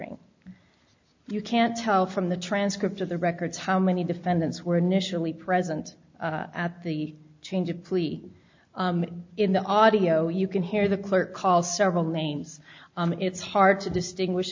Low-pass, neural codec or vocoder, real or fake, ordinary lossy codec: 7.2 kHz; none; real; MP3, 64 kbps